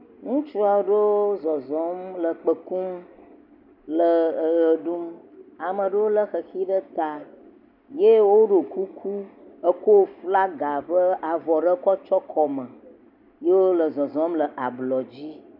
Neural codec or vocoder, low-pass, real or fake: none; 5.4 kHz; real